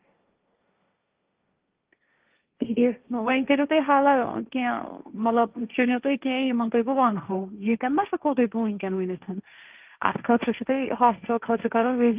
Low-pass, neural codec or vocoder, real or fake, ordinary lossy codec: 3.6 kHz; codec, 16 kHz, 1.1 kbps, Voila-Tokenizer; fake; Opus, 32 kbps